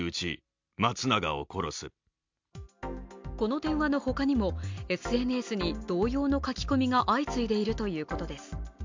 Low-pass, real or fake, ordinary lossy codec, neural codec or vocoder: 7.2 kHz; real; MP3, 64 kbps; none